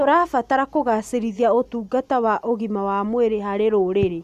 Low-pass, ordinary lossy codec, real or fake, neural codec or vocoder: 14.4 kHz; none; real; none